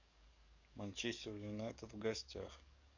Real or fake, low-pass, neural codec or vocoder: fake; 7.2 kHz; codec, 44.1 kHz, 7.8 kbps, DAC